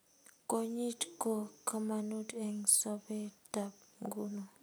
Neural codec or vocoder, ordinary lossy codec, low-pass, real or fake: none; none; none; real